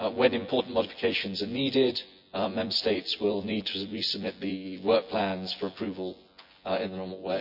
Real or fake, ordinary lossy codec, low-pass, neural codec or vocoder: fake; none; 5.4 kHz; vocoder, 24 kHz, 100 mel bands, Vocos